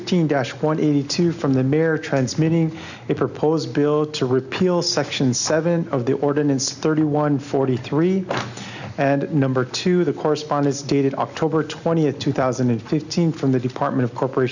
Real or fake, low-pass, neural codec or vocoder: real; 7.2 kHz; none